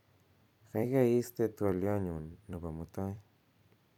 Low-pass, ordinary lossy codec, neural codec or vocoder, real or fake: 19.8 kHz; none; vocoder, 44.1 kHz, 128 mel bands every 256 samples, BigVGAN v2; fake